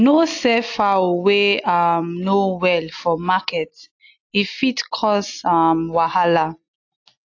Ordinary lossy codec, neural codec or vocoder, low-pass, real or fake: AAC, 48 kbps; none; 7.2 kHz; real